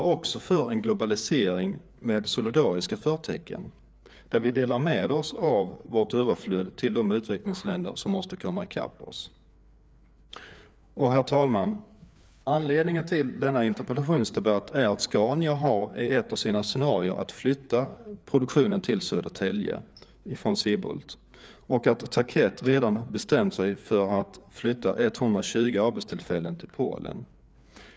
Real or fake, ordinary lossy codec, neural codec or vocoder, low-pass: fake; none; codec, 16 kHz, 4 kbps, FreqCodec, larger model; none